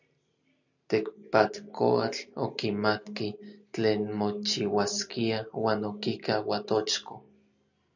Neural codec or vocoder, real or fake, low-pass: none; real; 7.2 kHz